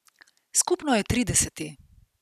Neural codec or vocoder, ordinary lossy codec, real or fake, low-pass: none; none; real; 14.4 kHz